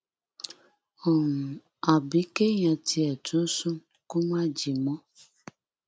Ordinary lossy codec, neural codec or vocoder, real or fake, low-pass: none; none; real; none